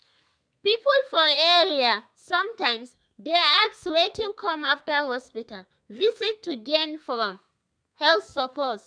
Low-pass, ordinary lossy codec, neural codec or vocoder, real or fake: 9.9 kHz; none; codec, 32 kHz, 1.9 kbps, SNAC; fake